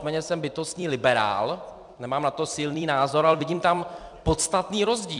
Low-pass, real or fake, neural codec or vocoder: 10.8 kHz; fake; vocoder, 44.1 kHz, 128 mel bands every 256 samples, BigVGAN v2